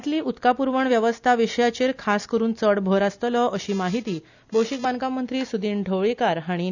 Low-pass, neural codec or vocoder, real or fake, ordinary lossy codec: 7.2 kHz; none; real; none